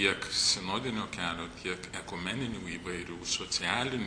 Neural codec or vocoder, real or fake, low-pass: none; real; 9.9 kHz